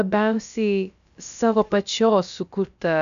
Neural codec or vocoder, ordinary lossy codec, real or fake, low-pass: codec, 16 kHz, about 1 kbps, DyCAST, with the encoder's durations; MP3, 96 kbps; fake; 7.2 kHz